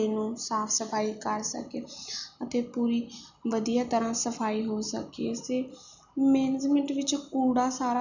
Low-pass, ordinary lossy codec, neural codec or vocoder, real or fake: 7.2 kHz; none; none; real